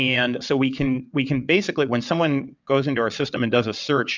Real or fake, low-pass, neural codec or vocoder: fake; 7.2 kHz; vocoder, 22.05 kHz, 80 mel bands, WaveNeXt